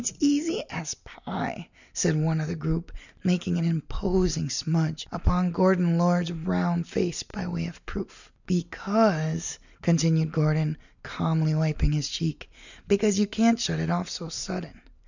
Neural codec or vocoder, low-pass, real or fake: none; 7.2 kHz; real